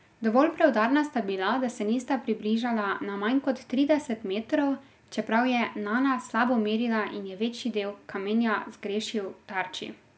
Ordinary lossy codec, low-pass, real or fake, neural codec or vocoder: none; none; real; none